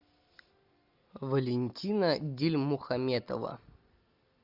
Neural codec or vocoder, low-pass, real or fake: none; 5.4 kHz; real